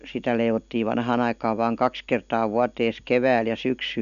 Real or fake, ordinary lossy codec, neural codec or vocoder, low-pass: real; none; none; 7.2 kHz